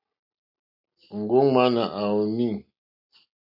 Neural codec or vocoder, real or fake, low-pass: none; real; 5.4 kHz